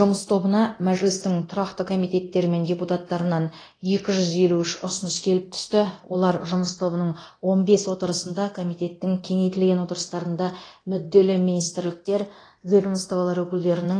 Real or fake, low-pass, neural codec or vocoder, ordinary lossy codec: fake; 9.9 kHz; codec, 24 kHz, 0.9 kbps, DualCodec; AAC, 32 kbps